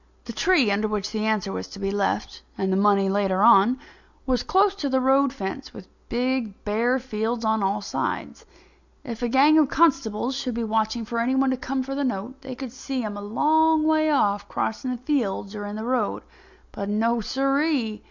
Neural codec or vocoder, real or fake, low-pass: none; real; 7.2 kHz